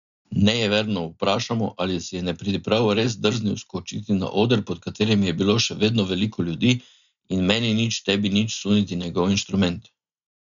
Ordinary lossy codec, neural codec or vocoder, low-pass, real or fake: none; none; 7.2 kHz; real